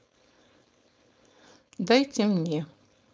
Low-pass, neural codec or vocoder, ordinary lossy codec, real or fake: none; codec, 16 kHz, 4.8 kbps, FACodec; none; fake